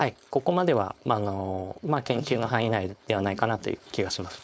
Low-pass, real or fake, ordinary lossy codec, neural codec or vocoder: none; fake; none; codec, 16 kHz, 4.8 kbps, FACodec